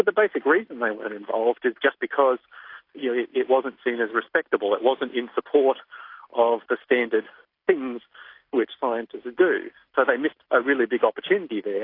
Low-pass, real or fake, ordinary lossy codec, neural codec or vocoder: 5.4 kHz; real; AAC, 32 kbps; none